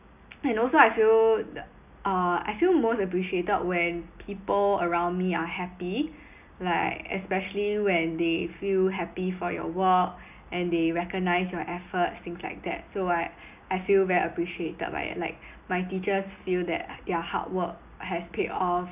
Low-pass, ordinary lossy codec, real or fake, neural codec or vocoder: 3.6 kHz; none; real; none